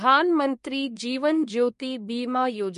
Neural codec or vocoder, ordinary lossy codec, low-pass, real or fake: codec, 24 kHz, 1 kbps, SNAC; MP3, 48 kbps; 10.8 kHz; fake